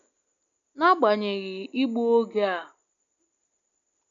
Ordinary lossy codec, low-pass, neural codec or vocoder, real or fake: none; 7.2 kHz; none; real